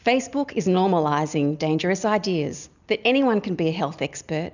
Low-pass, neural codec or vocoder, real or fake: 7.2 kHz; none; real